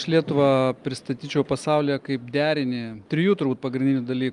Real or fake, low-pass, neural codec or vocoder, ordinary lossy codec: real; 10.8 kHz; none; Opus, 32 kbps